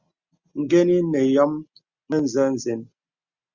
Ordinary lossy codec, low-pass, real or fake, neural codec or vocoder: Opus, 64 kbps; 7.2 kHz; real; none